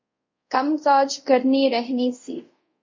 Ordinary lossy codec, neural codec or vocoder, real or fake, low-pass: MP3, 48 kbps; codec, 24 kHz, 0.5 kbps, DualCodec; fake; 7.2 kHz